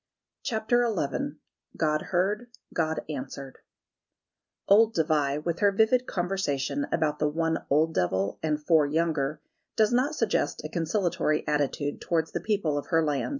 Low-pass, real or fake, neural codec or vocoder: 7.2 kHz; real; none